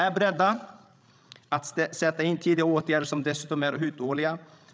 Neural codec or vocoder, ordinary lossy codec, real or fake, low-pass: codec, 16 kHz, 16 kbps, FreqCodec, larger model; none; fake; none